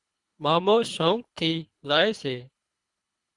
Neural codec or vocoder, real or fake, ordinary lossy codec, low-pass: codec, 24 kHz, 3 kbps, HILCodec; fake; Opus, 64 kbps; 10.8 kHz